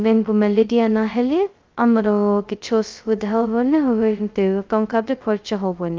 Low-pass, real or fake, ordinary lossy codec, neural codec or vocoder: 7.2 kHz; fake; Opus, 24 kbps; codec, 16 kHz, 0.2 kbps, FocalCodec